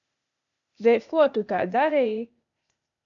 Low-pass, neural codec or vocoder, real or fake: 7.2 kHz; codec, 16 kHz, 0.8 kbps, ZipCodec; fake